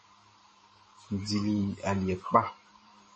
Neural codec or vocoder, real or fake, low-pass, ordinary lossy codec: vocoder, 44.1 kHz, 128 mel bands every 512 samples, BigVGAN v2; fake; 10.8 kHz; MP3, 32 kbps